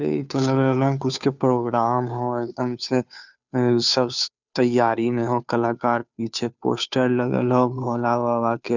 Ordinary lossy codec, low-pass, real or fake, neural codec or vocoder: none; 7.2 kHz; fake; codec, 16 kHz, 2 kbps, FunCodec, trained on Chinese and English, 25 frames a second